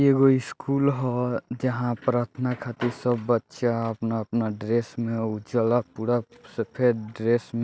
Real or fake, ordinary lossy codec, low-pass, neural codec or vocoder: real; none; none; none